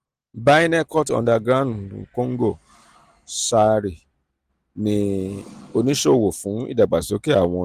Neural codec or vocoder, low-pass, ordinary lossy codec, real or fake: none; 14.4 kHz; Opus, 24 kbps; real